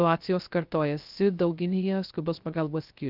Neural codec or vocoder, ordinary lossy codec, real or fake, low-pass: codec, 16 kHz, 0.3 kbps, FocalCodec; Opus, 24 kbps; fake; 5.4 kHz